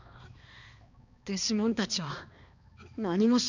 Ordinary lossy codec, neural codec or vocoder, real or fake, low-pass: none; codec, 16 kHz, 2 kbps, X-Codec, HuBERT features, trained on balanced general audio; fake; 7.2 kHz